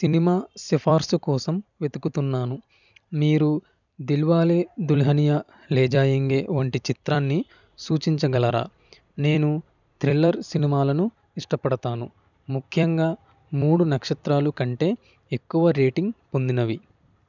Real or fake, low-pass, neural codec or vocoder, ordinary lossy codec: fake; 7.2 kHz; vocoder, 44.1 kHz, 128 mel bands every 256 samples, BigVGAN v2; none